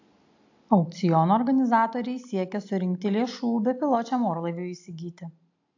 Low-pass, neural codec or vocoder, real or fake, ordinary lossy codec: 7.2 kHz; none; real; AAC, 48 kbps